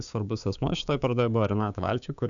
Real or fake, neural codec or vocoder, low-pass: fake; codec, 16 kHz, 6 kbps, DAC; 7.2 kHz